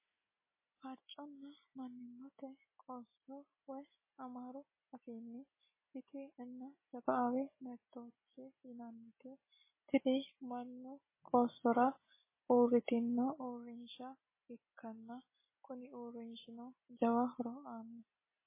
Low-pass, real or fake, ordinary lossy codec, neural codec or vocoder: 3.6 kHz; real; MP3, 16 kbps; none